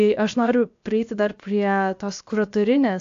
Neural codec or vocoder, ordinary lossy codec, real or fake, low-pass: codec, 16 kHz, 0.7 kbps, FocalCodec; AAC, 64 kbps; fake; 7.2 kHz